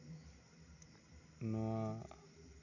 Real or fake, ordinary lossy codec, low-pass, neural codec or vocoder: real; none; 7.2 kHz; none